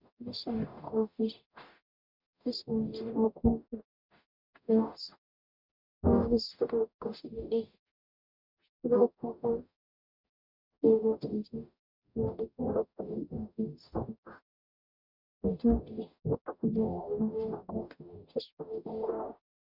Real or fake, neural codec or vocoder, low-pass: fake; codec, 44.1 kHz, 0.9 kbps, DAC; 5.4 kHz